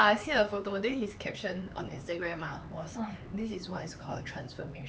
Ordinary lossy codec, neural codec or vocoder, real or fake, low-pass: none; codec, 16 kHz, 4 kbps, X-Codec, HuBERT features, trained on LibriSpeech; fake; none